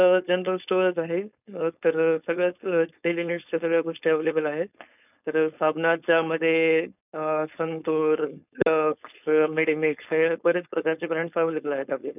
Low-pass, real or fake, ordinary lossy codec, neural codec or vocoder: 3.6 kHz; fake; none; codec, 16 kHz, 4.8 kbps, FACodec